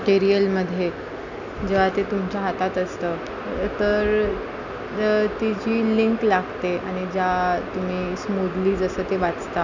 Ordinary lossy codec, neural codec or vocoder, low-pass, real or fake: none; none; 7.2 kHz; real